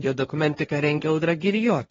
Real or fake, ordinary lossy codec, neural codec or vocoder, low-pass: fake; AAC, 24 kbps; codec, 16 kHz, 1.1 kbps, Voila-Tokenizer; 7.2 kHz